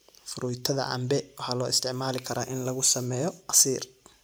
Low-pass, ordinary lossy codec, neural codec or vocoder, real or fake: none; none; none; real